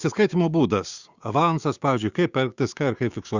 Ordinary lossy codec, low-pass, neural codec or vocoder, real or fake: Opus, 64 kbps; 7.2 kHz; codec, 16 kHz, 6 kbps, DAC; fake